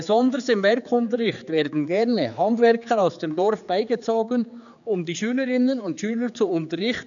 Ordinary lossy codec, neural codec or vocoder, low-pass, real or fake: none; codec, 16 kHz, 4 kbps, X-Codec, HuBERT features, trained on general audio; 7.2 kHz; fake